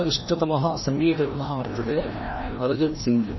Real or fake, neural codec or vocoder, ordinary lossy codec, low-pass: fake; codec, 16 kHz, 1 kbps, FreqCodec, larger model; MP3, 24 kbps; 7.2 kHz